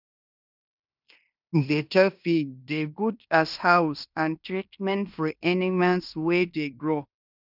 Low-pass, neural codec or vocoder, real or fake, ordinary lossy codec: 5.4 kHz; codec, 16 kHz in and 24 kHz out, 0.9 kbps, LongCat-Audio-Codec, fine tuned four codebook decoder; fake; none